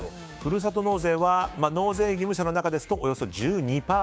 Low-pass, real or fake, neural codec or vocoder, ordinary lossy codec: none; fake; codec, 16 kHz, 6 kbps, DAC; none